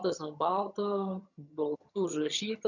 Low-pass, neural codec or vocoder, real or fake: 7.2 kHz; vocoder, 22.05 kHz, 80 mel bands, HiFi-GAN; fake